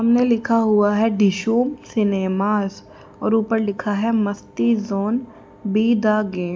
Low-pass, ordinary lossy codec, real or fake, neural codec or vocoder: none; none; real; none